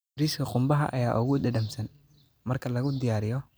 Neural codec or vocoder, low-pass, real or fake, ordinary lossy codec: none; none; real; none